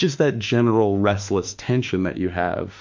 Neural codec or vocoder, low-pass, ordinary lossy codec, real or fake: autoencoder, 48 kHz, 32 numbers a frame, DAC-VAE, trained on Japanese speech; 7.2 kHz; MP3, 64 kbps; fake